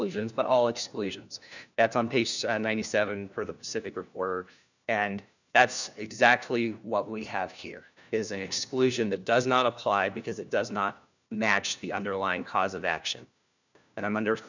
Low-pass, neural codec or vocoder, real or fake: 7.2 kHz; codec, 16 kHz, 1 kbps, FunCodec, trained on LibriTTS, 50 frames a second; fake